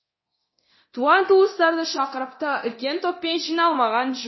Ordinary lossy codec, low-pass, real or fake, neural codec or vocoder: MP3, 24 kbps; 7.2 kHz; fake; codec, 24 kHz, 0.9 kbps, DualCodec